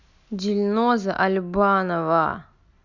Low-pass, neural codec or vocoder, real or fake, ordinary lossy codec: 7.2 kHz; none; real; Opus, 64 kbps